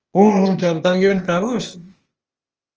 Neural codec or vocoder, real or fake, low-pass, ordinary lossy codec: codec, 16 kHz, 0.8 kbps, ZipCodec; fake; 7.2 kHz; Opus, 24 kbps